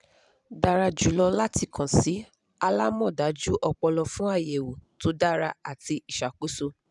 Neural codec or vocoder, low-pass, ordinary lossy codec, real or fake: vocoder, 44.1 kHz, 128 mel bands every 512 samples, BigVGAN v2; 10.8 kHz; none; fake